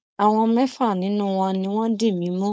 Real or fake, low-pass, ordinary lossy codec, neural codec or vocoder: fake; none; none; codec, 16 kHz, 4.8 kbps, FACodec